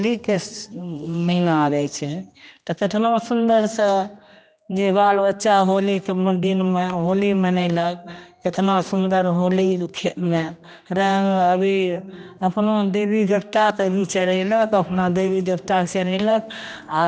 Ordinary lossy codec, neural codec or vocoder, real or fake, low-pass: none; codec, 16 kHz, 1 kbps, X-Codec, HuBERT features, trained on general audio; fake; none